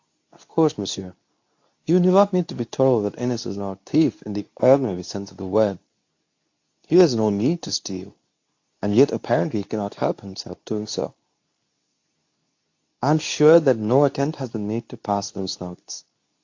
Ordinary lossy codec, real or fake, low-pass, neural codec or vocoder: AAC, 48 kbps; fake; 7.2 kHz; codec, 24 kHz, 0.9 kbps, WavTokenizer, medium speech release version 2